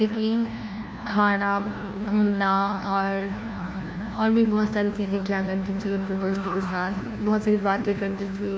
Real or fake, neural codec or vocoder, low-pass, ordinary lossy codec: fake; codec, 16 kHz, 1 kbps, FunCodec, trained on LibriTTS, 50 frames a second; none; none